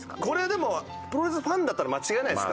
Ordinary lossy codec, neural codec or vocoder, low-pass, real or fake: none; none; none; real